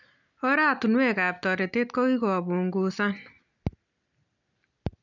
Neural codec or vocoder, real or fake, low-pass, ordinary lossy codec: none; real; 7.2 kHz; none